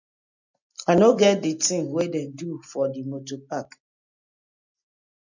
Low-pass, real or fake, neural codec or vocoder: 7.2 kHz; real; none